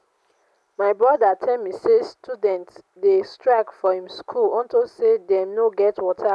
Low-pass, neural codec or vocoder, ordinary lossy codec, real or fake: none; none; none; real